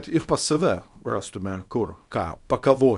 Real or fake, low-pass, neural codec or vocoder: fake; 10.8 kHz; codec, 24 kHz, 0.9 kbps, WavTokenizer, small release